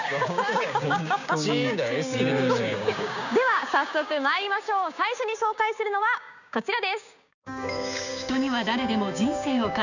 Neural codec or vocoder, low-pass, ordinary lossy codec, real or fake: codec, 16 kHz, 6 kbps, DAC; 7.2 kHz; none; fake